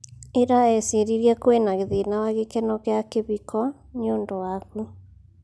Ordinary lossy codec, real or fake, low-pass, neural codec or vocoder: none; real; none; none